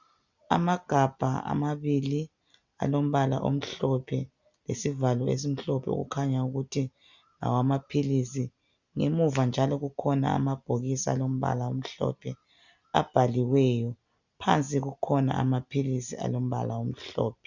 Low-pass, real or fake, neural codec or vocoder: 7.2 kHz; real; none